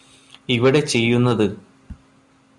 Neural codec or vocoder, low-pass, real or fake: none; 10.8 kHz; real